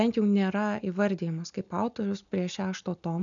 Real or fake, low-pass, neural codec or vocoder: real; 7.2 kHz; none